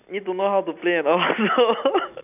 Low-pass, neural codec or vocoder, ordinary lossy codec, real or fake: 3.6 kHz; none; none; real